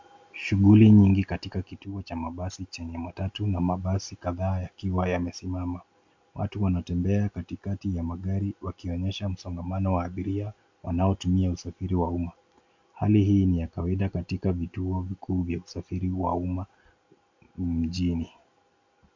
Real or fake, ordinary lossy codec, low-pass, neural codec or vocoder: real; MP3, 64 kbps; 7.2 kHz; none